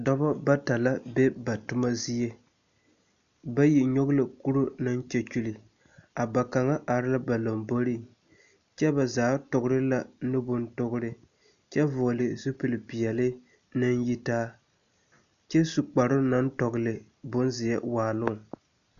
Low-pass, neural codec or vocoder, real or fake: 7.2 kHz; none; real